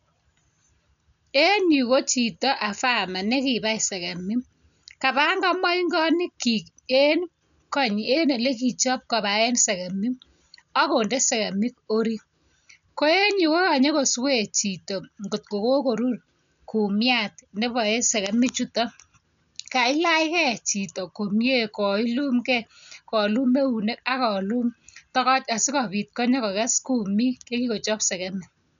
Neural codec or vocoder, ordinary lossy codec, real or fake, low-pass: none; none; real; 7.2 kHz